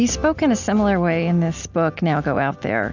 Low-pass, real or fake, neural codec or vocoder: 7.2 kHz; real; none